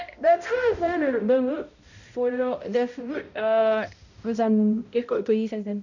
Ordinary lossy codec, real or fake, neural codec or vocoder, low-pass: none; fake; codec, 16 kHz, 0.5 kbps, X-Codec, HuBERT features, trained on balanced general audio; 7.2 kHz